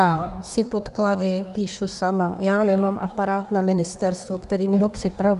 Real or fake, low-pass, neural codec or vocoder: fake; 10.8 kHz; codec, 24 kHz, 1 kbps, SNAC